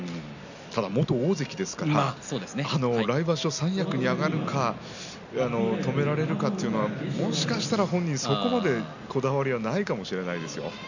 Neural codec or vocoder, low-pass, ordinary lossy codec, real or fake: none; 7.2 kHz; none; real